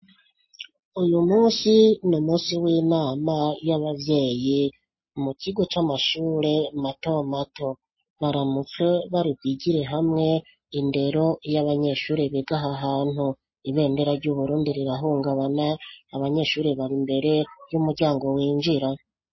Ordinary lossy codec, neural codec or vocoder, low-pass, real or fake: MP3, 24 kbps; none; 7.2 kHz; real